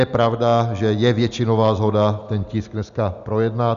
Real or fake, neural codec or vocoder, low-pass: real; none; 7.2 kHz